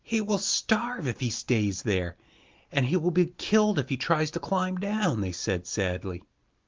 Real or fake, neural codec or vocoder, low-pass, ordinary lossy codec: real; none; 7.2 kHz; Opus, 32 kbps